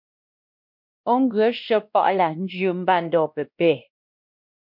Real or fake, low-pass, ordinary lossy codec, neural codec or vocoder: fake; 5.4 kHz; MP3, 48 kbps; codec, 16 kHz, 1 kbps, X-Codec, WavLM features, trained on Multilingual LibriSpeech